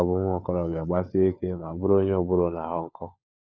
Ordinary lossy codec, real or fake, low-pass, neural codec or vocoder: none; fake; none; codec, 16 kHz, 4 kbps, FunCodec, trained on LibriTTS, 50 frames a second